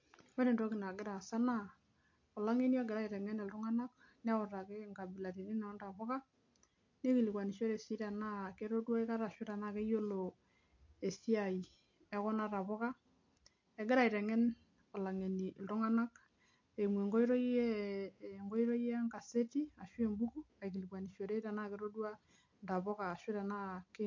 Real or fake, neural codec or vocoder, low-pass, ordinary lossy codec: real; none; 7.2 kHz; MP3, 48 kbps